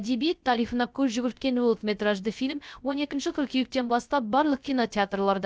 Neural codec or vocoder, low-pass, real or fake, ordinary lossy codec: codec, 16 kHz, 0.3 kbps, FocalCodec; none; fake; none